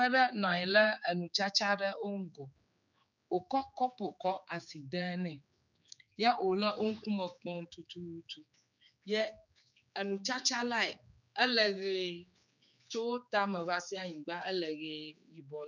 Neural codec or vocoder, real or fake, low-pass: codec, 16 kHz, 4 kbps, X-Codec, HuBERT features, trained on general audio; fake; 7.2 kHz